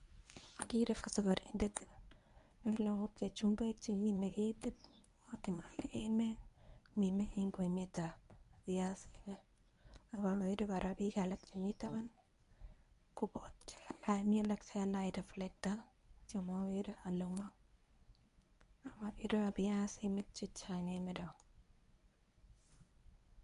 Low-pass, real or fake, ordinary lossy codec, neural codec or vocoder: 10.8 kHz; fake; MP3, 96 kbps; codec, 24 kHz, 0.9 kbps, WavTokenizer, medium speech release version 1